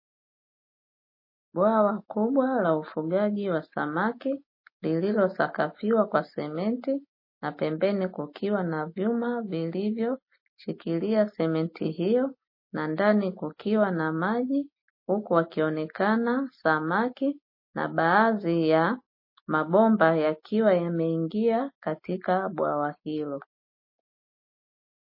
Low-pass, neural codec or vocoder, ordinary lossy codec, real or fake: 5.4 kHz; none; MP3, 24 kbps; real